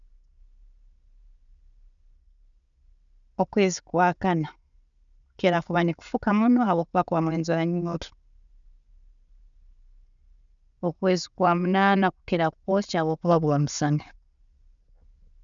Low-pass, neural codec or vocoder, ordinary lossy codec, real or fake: 7.2 kHz; none; none; real